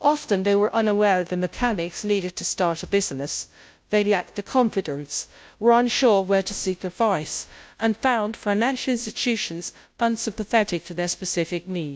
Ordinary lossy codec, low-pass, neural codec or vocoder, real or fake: none; none; codec, 16 kHz, 0.5 kbps, FunCodec, trained on Chinese and English, 25 frames a second; fake